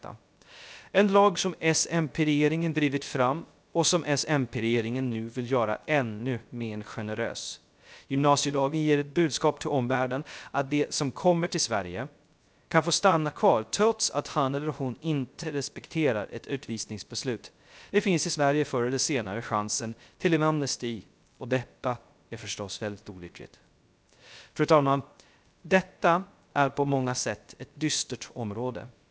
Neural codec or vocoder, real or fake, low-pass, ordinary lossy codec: codec, 16 kHz, 0.3 kbps, FocalCodec; fake; none; none